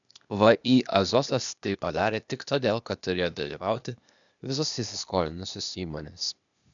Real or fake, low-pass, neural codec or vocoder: fake; 7.2 kHz; codec, 16 kHz, 0.8 kbps, ZipCodec